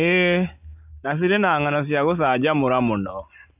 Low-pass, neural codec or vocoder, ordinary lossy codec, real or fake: 3.6 kHz; none; AAC, 32 kbps; real